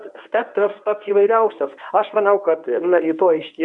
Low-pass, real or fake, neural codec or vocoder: 10.8 kHz; fake; codec, 24 kHz, 0.9 kbps, WavTokenizer, medium speech release version 1